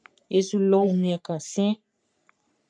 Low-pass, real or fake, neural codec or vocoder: 9.9 kHz; fake; codec, 44.1 kHz, 3.4 kbps, Pupu-Codec